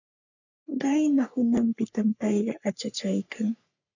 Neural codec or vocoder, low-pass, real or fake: codec, 44.1 kHz, 3.4 kbps, Pupu-Codec; 7.2 kHz; fake